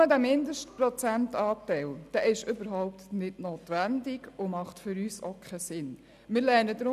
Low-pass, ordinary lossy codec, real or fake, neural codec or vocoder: 14.4 kHz; none; real; none